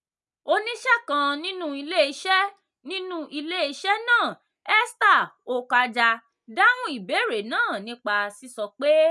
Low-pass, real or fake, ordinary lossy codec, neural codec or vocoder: none; real; none; none